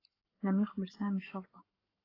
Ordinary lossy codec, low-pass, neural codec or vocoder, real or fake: AAC, 24 kbps; 5.4 kHz; codec, 44.1 kHz, 7.8 kbps, Pupu-Codec; fake